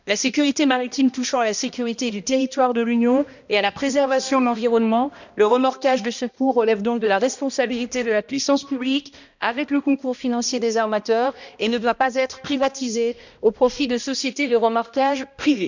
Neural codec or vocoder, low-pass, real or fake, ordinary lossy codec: codec, 16 kHz, 1 kbps, X-Codec, HuBERT features, trained on balanced general audio; 7.2 kHz; fake; none